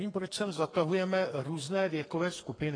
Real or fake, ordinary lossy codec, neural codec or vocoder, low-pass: fake; AAC, 32 kbps; codec, 32 kHz, 1.9 kbps, SNAC; 9.9 kHz